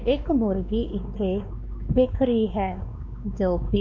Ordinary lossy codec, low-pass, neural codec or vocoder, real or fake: none; 7.2 kHz; codec, 16 kHz, 2 kbps, X-Codec, WavLM features, trained on Multilingual LibriSpeech; fake